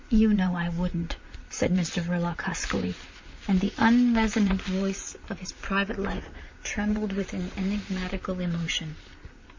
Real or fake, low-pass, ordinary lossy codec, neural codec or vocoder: fake; 7.2 kHz; MP3, 64 kbps; vocoder, 44.1 kHz, 128 mel bands, Pupu-Vocoder